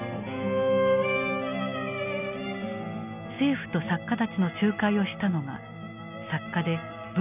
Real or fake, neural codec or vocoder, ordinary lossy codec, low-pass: real; none; none; 3.6 kHz